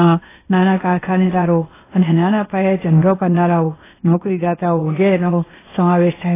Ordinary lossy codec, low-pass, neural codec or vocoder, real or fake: AAC, 16 kbps; 3.6 kHz; codec, 16 kHz, 0.8 kbps, ZipCodec; fake